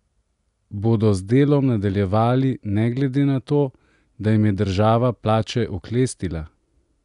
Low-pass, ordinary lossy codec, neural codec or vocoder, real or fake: 10.8 kHz; none; none; real